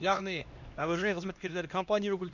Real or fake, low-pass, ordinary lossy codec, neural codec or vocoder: fake; 7.2 kHz; none; codec, 16 kHz, 1 kbps, X-Codec, HuBERT features, trained on LibriSpeech